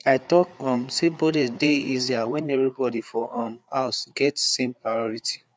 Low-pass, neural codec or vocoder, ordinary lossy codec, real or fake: none; codec, 16 kHz, 4 kbps, FreqCodec, larger model; none; fake